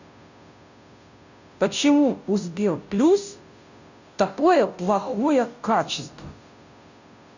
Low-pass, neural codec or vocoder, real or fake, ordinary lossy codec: 7.2 kHz; codec, 16 kHz, 0.5 kbps, FunCodec, trained on Chinese and English, 25 frames a second; fake; none